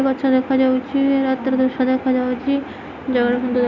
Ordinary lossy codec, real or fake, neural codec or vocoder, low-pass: none; real; none; 7.2 kHz